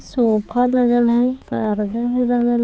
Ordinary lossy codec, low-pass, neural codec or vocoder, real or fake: none; none; codec, 16 kHz, 4 kbps, X-Codec, HuBERT features, trained on balanced general audio; fake